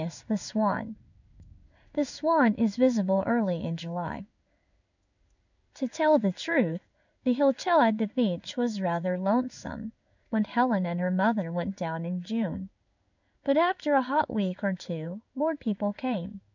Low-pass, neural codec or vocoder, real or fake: 7.2 kHz; codec, 16 kHz in and 24 kHz out, 1 kbps, XY-Tokenizer; fake